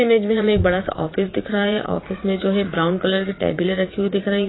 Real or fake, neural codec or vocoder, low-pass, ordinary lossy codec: fake; vocoder, 22.05 kHz, 80 mel bands, Vocos; 7.2 kHz; AAC, 16 kbps